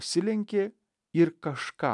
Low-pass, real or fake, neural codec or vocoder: 10.8 kHz; real; none